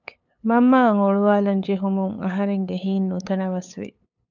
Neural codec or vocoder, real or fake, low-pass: codec, 16 kHz, 8 kbps, FunCodec, trained on LibriTTS, 25 frames a second; fake; 7.2 kHz